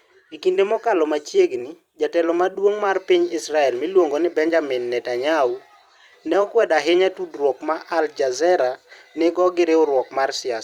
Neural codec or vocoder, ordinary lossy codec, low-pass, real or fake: vocoder, 44.1 kHz, 128 mel bands every 256 samples, BigVGAN v2; Opus, 64 kbps; 19.8 kHz; fake